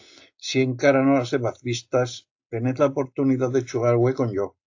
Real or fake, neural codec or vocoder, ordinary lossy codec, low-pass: real; none; AAC, 48 kbps; 7.2 kHz